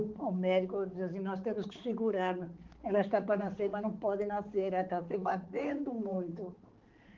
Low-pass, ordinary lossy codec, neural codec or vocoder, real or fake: 7.2 kHz; Opus, 16 kbps; codec, 16 kHz, 4 kbps, X-Codec, HuBERT features, trained on balanced general audio; fake